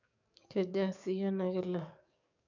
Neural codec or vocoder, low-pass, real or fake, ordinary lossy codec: codec, 44.1 kHz, 7.8 kbps, DAC; 7.2 kHz; fake; none